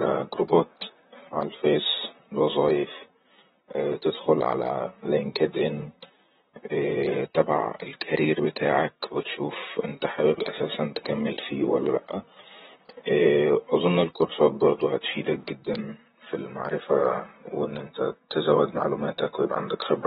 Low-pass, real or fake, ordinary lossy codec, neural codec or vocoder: 19.8 kHz; fake; AAC, 16 kbps; vocoder, 44.1 kHz, 128 mel bands, Pupu-Vocoder